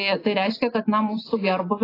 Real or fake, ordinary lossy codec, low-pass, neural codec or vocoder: real; AAC, 24 kbps; 5.4 kHz; none